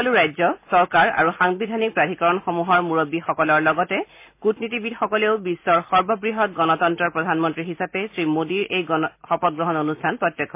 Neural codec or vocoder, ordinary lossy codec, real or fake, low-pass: none; MP3, 24 kbps; real; 3.6 kHz